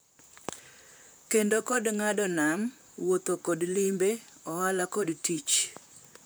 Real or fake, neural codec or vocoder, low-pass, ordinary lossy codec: fake; vocoder, 44.1 kHz, 128 mel bands, Pupu-Vocoder; none; none